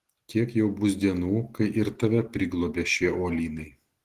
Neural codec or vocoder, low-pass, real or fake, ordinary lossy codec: none; 14.4 kHz; real; Opus, 16 kbps